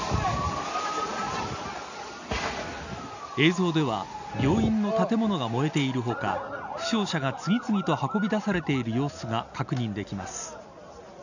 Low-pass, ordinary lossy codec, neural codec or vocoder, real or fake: 7.2 kHz; none; none; real